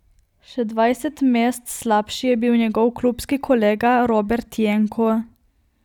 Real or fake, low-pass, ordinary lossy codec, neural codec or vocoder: fake; 19.8 kHz; none; vocoder, 44.1 kHz, 128 mel bands every 256 samples, BigVGAN v2